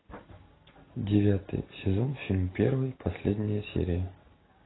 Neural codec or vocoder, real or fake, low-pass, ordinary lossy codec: none; real; 7.2 kHz; AAC, 16 kbps